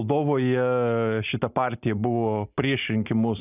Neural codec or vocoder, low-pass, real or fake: none; 3.6 kHz; real